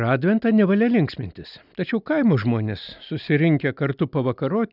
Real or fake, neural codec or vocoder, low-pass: real; none; 5.4 kHz